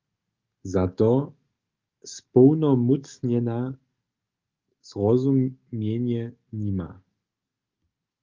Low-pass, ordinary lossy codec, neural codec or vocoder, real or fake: 7.2 kHz; Opus, 24 kbps; codec, 44.1 kHz, 7.8 kbps, DAC; fake